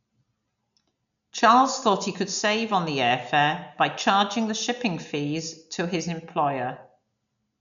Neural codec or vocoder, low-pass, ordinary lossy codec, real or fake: none; 7.2 kHz; none; real